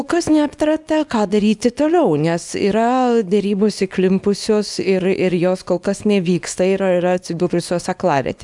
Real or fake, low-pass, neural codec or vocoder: fake; 10.8 kHz; codec, 24 kHz, 0.9 kbps, WavTokenizer, small release